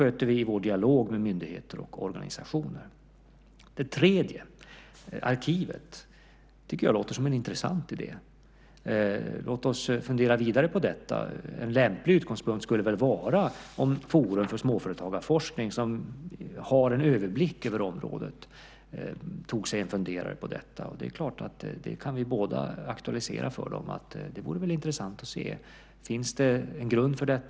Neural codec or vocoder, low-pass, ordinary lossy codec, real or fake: none; none; none; real